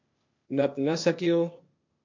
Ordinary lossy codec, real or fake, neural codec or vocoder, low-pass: MP3, 48 kbps; fake; codec, 16 kHz, 0.8 kbps, ZipCodec; 7.2 kHz